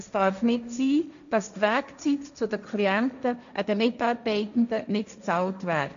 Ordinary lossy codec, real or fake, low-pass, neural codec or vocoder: none; fake; 7.2 kHz; codec, 16 kHz, 1.1 kbps, Voila-Tokenizer